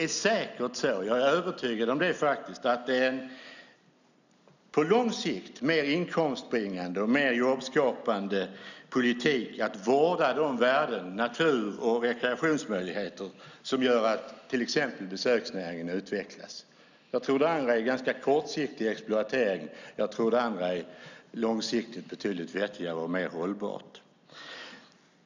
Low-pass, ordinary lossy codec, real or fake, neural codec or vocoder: 7.2 kHz; none; real; none